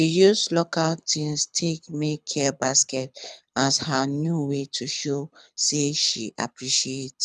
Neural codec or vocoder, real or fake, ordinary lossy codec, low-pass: codec, 24 kHz, 6 kbps, HILCodec; fake; none; none